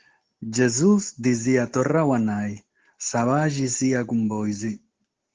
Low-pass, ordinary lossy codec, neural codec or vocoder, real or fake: 7.2 kHz; Opus, 16 kbps; none; real